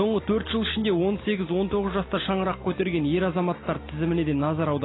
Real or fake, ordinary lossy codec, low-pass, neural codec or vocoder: real; AAC, 16 kbps; 7.2 kHz; none